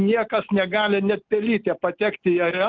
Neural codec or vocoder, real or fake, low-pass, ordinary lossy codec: none; real; 7.2 kHz; Opus, 32 kbps